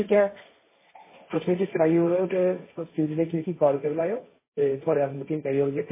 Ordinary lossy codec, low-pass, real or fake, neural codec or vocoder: MP3, 16 kbps; 3.6 kHz; fake; codec, 16 kHz, 1.1 kbps, Voila-Tokenizer